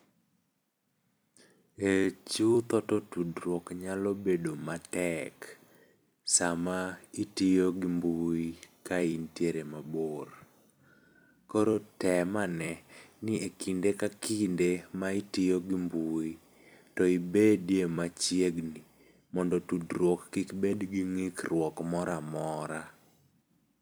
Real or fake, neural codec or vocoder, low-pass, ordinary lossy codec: fake; vocoder, 44.1 kHz, 128 mel bands every 256 samples, BigVGAN v2; none; none